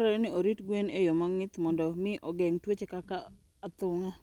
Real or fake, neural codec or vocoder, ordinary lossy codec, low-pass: real; none; Opus, 32 kbps; 19.8 kHz